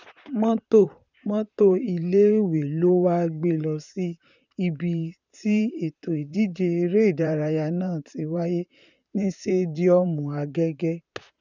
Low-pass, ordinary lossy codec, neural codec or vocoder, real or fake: 7.2 kHz; none; vocoder, 44.1 kHz, 128 mel bands, Pupu-Vocoder; fake